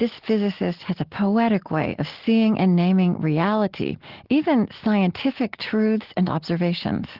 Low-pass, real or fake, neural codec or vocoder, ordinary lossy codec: 5.4 kHz; real; none; Opus, 16 kbps